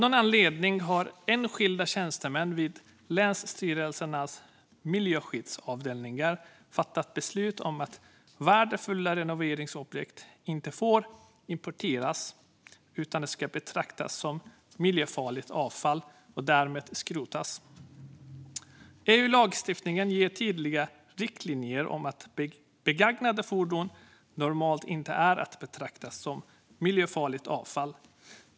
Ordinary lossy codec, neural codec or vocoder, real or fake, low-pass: none; none; real; none